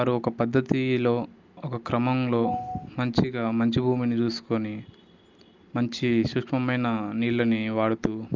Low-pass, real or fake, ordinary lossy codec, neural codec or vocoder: 7.2 kHz; real; Opus, 24 kbps; none